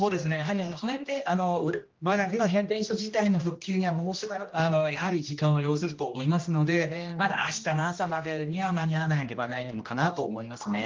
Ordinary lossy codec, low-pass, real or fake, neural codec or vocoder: Opus, 32 kbps; 7.2 kHz; fake; codec, 16 kHz, 1 kbps, X-Codec, HuBERT features, trained on general audio